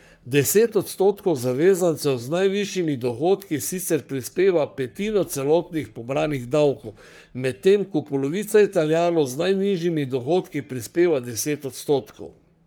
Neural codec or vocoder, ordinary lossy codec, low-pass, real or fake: codec, 44.1 kHz, 3.4 kbps, Pupu-Codec; none; none; fake